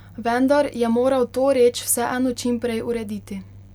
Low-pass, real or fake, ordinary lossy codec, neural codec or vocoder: 19.8 kHz; real; none; none